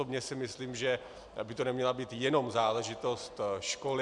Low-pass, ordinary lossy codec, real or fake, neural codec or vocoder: 10.8 kHz; MP3, 96 kbps; real; none